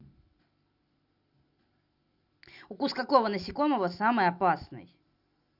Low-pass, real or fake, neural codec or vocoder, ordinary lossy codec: 5.4 kHz; real; none; Opus, 64 kbps